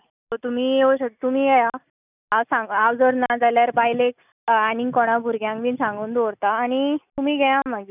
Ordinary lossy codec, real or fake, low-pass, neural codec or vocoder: none; real; 3.6 kHz; none